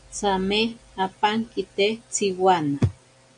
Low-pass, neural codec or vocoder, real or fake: 9.9 kHz; none; real